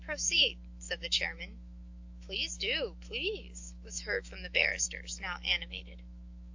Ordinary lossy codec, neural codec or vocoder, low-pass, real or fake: AAC, 48 kbps; autoencoder, 48 kHz, 128 numbers a frame, DAC-VAE, trained on Japanese speech; 7.2 kHz; fake